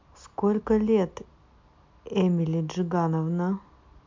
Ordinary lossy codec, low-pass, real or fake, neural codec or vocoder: MP3, 64 kbps; 7.2 kHz; real; none